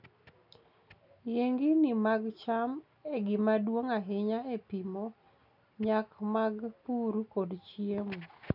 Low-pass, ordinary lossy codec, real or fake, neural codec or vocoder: 5.4 kHz; none; real; none